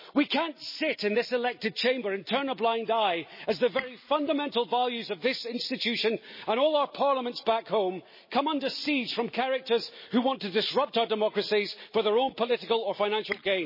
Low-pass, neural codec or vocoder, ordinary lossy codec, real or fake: 5.4 kHz; none; MP3, 24 kbps; real